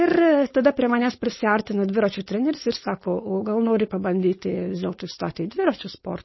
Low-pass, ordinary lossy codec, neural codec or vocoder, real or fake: 7.2 kHz; MP3, 24 kbps; codec, 16 kHz, 4.8 kbps, FACodec; fake